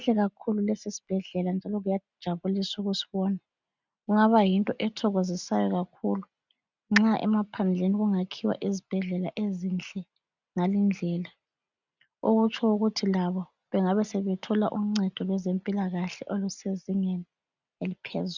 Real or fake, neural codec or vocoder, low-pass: real; none; 7.2 kHz